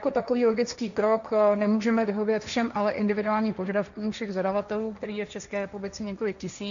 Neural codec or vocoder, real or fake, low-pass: codec, 16 kHz, 1.1 kbps, Voila-Tokenizer; fake; 7.2 kHz